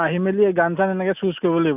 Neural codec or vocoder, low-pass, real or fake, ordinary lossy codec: none; 3.6 kHz; real; none